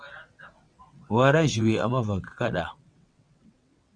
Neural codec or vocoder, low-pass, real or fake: vocoder, 22.05 kHz, 80 mel bands, WaveNeXt; 9.9 kHz; fake